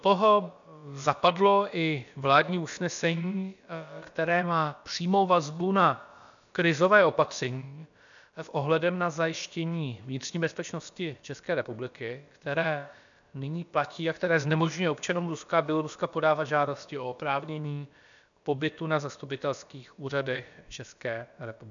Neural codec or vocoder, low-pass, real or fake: codec, 16 kHz, about 1 kbps, DyCAST, with the encoder's durations; 7.2 kHz; fake